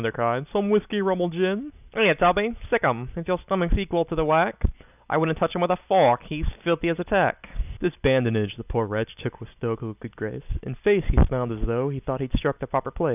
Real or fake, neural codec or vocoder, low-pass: real; none; 3.6 kHz